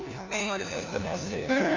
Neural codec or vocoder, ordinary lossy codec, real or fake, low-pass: codec, 16 kHz, 1 kbps, FunCodec, trained on LibriTTS, 50 frames a second; none; fake; 7.2 kHz